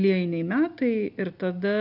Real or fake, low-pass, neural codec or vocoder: real; 5.4 kHz; none